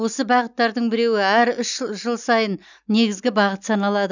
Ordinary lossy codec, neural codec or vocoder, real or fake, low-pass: none; none; real; 7.2 kHz